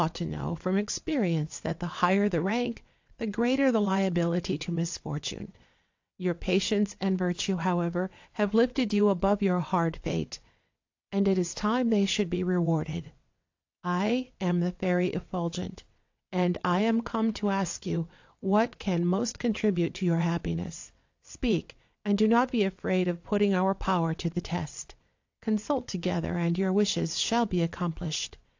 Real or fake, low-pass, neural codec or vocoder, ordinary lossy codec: fake; 7.2 kHz; vocoder, 22.05 kHz, 80 mel bands, WaveNeXt; AAC, 48 kbps